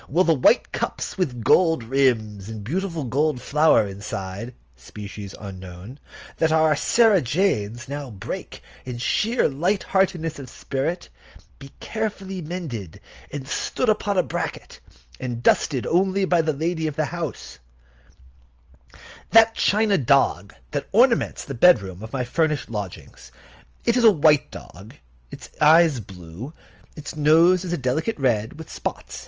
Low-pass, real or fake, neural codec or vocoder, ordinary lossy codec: 7.2 kHz; real; none; Opus, 24 kbps